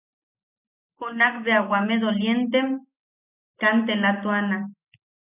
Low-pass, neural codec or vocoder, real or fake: 3.6 kHz; none; real